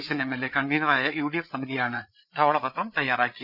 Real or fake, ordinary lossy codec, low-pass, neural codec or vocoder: fake; none; 5.4 kHz; codec, 16 kHz, 8 kbps, FreqCodec, larger model